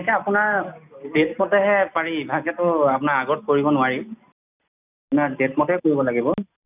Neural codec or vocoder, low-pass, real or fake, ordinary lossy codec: none; 3.6 kHz; real; none